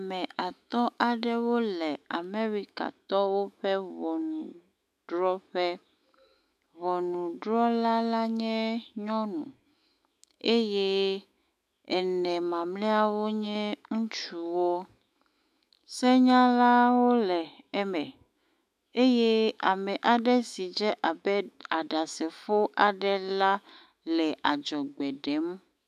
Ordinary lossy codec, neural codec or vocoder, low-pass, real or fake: MP3, 96 kbps; autoencoder, 48 kHz, 128 numbers a frame, DAC-VAE, trained on Japanese speech; 14.4 kHz; fake